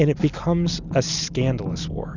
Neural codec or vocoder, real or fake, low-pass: none; real; 7.2 kHz